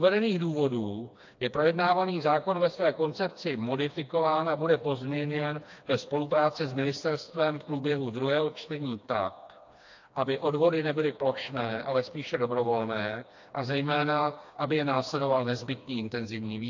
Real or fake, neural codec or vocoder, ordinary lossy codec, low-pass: fake; codec, 16 kHz, 2 kbps, FreqCodec, smaller model; AAC, 48 kbps; 7.2 kHz